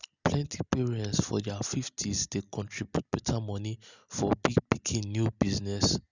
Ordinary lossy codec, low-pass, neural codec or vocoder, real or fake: none; 7.2 kHz; none; real